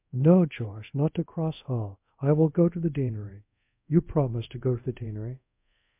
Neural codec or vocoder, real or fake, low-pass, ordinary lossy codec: codec, 24 kHz, 0.5 kbps, DualCodec; fake; 3.6 kHz; MP3, 32 kbps